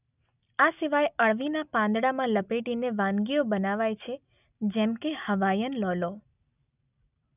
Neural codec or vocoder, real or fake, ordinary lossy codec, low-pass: none; real; none; 3.6 kHz